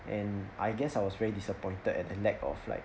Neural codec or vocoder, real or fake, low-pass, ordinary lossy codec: none; real; none; none